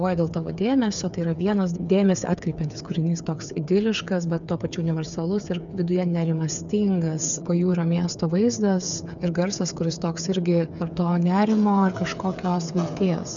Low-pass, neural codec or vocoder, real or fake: 7.2 kHz; codec, 16 kHz, 8 kbps, FreqCodec, smaller model; fake